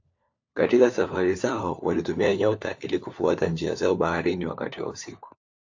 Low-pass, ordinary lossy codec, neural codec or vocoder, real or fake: 7.2 kHz; AAC, 48 kbps; codec, 16 kHz, 4 kbps, FunCodec, trained on LibriTTS, 50 frames a second; fake